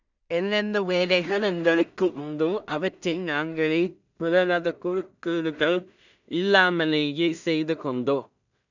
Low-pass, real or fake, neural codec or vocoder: 7.2 kHz; fake; codec, 16 kHz in and 24 kHz out, 0.4 kbps, LongCat-Audio-Codec, two codebook decoder